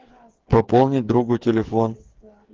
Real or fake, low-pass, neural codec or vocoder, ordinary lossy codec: fake; 7.2 kHz; codec, 16 kHz, 8 kbps, FreqCodec, smaller model; Opus, 16 kbps